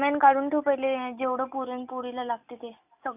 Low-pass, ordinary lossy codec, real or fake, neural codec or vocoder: 3.6 kHz; none; real; none